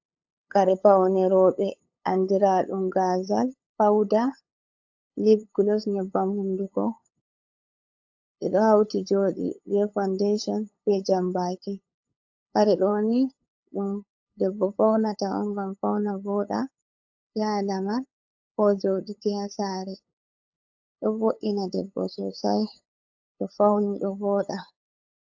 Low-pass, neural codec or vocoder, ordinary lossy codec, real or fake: 7.2 kHz; codec, 16 kHz, 8 kbps, FunCodec, trained on LibriTTS, 25 frames a second; AAC, 48 kbps; fake